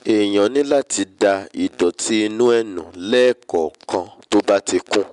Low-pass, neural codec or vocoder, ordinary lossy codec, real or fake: 10.8 kHz; none; MP3, 96 kbps; real